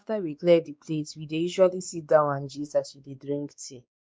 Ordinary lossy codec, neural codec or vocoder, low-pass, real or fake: none; codec, 16 kHz, 2 kbps, X-Codec, WavLM features, trained on Multilingual LibriSpeech; none; fake